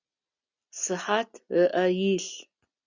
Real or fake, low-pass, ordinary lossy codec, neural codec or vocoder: real; 7.2 kHz; Opus, 64 kbps; none